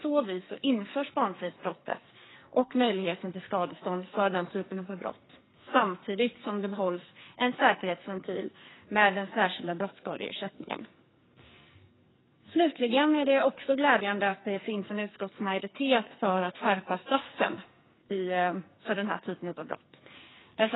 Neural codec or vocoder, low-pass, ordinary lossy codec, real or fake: codec, 44.1 kHz, 2.6 kbps, SNAC; 7.2 kHz; AAC, 16 kbps; fake